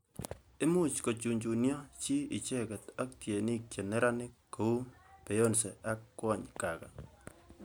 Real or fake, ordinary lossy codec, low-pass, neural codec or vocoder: real; none; none; none